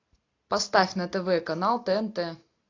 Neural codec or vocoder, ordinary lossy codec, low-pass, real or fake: none; AAC, 48 kbps; 7.2 kHz; real